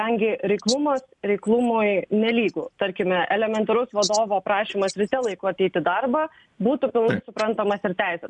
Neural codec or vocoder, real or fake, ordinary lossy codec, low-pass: none; real; MP3, 96 kbps; 10.8 kHz